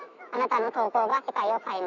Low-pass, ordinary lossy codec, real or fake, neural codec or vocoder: 7.2 kHz; none; fake; autoencoder, 48 kHz, 128 numbers a frame, DAC-VAE, trained on Japanese speech